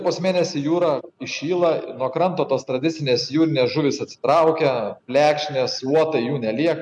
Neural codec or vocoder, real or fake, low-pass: none; real; 10.8 kHz